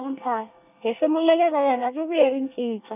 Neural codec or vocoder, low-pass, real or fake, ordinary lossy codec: codec, 24 kHz, 1 kbps, SNAC; 3.6 kHz; fake; none